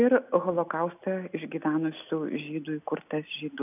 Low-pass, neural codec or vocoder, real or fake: 3.6 kHz; none; real